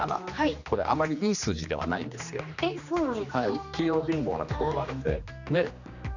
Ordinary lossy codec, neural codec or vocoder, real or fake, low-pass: none; codec, 16 kHz, 2 kbps, X-Codec, HuBERT features, trained on general audio; fake; 7.2 kHz